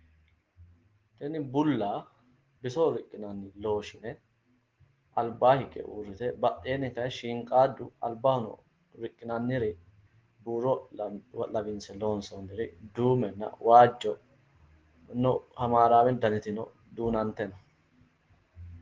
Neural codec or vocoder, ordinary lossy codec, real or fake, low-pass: none; Opus, 16 kbps; real; 7.2 kHz